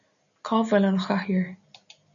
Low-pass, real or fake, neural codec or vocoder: 7.2 kHz; real; none